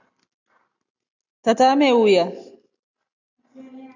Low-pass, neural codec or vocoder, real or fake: 7.2 kHz; none; real